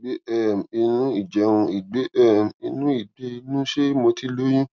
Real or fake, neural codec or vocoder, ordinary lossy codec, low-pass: real; none; none; none